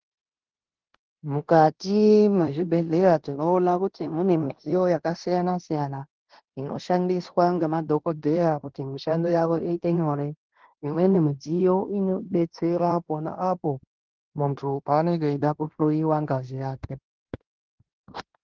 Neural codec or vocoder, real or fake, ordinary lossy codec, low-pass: codec, 16 kHz in and 24 kHz out, 0.9 kbps, LongCat-Audio-Codec, fine tuned four codebook decoder; fake; Opus, 16 kbps; 7.2 kHz